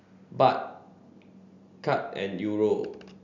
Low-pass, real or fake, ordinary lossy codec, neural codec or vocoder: 7.2 kHz; real; none; none